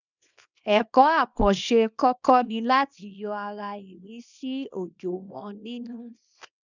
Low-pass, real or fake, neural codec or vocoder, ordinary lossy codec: 7.2 kHz; fake; codec, 24 kHz, 0.9 kbps, WavTokenizer, small release; none